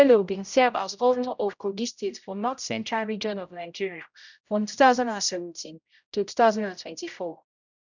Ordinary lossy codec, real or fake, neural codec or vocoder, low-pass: none; fake; codec, 16 kHz, 0.5 kbps, X-Codec, HuBERT features, trained on general audio; 7.2 kHz